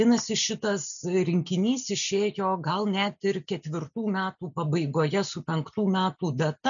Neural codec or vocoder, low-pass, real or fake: none; 7.2 kHz; real